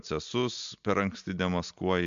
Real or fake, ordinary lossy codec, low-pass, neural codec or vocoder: real; MP3, 64 kbps; 7.2 kHz; none